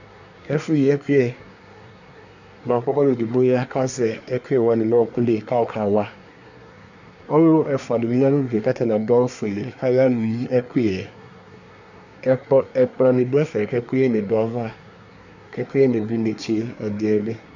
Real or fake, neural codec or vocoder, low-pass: fake; codec, 24 kHz, 1 kbps, SNAC; 7.2 kHz